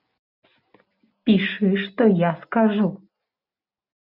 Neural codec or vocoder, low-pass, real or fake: none; 5.4 kHz; real